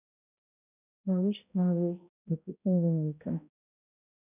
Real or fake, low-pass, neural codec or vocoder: fake; 3.6 kHz; codec, 16 kHz, 0.5 kbps, X-Codec, HuBERT features, trained on balanced general audio